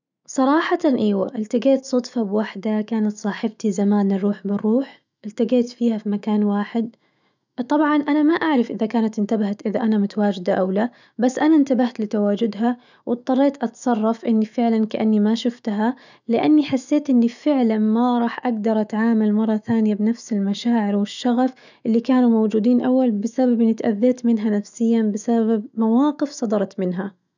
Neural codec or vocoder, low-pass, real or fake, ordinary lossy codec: autoencoder, 48 kHz, 128 numbers a frame, DAC-VAE, trained on Japanese speech; 7.2 kHz; fake; none